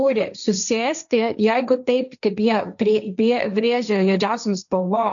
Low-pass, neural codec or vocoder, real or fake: 7.2 kHz; codec, 16 kHz, 1.1 kbps, Voila-Tokenizer; fake